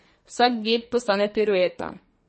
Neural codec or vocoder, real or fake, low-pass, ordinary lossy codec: codec, 44.1 kHz, 2.6 kbps, SNAC; fake; 10.8 kHz; MP3, 32 kbps